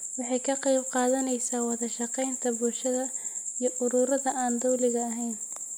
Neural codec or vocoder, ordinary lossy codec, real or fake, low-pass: none; none; real; none